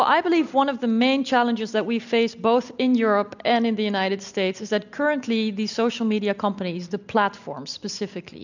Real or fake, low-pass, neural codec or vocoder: real; 7.2 kHz; none